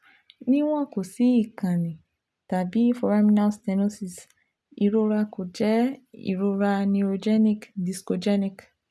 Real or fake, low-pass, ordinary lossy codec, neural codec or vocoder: real; none; none; none